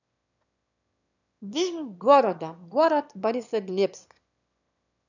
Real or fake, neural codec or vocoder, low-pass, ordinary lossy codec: fake; autoencoder, 22.05 kHz, a latent of 192 numbers a frame, VITS, trained on one speaker; 7.2 kHz; none